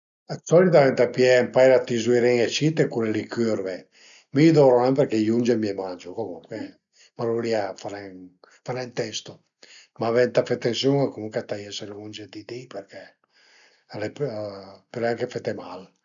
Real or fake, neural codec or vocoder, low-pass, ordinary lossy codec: real; none; 7.2 kHz; none